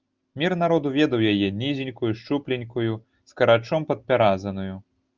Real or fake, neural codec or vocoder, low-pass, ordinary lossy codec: real; none; 7.2 kHz; Opus, 24 kbps